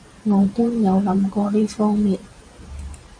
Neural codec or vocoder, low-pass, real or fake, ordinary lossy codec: vocoder, 44.1 kHz, 128 mel bands every 512 samples, BigVGAN v2; 9.9 kHz; fake; AAC, 64 kbps